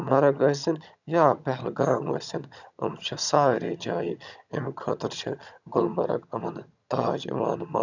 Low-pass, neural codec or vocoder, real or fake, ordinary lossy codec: 7.2 kHz; vocoder, 22.05 kHz, 80 mel bands, HiFi-GAN; fake; none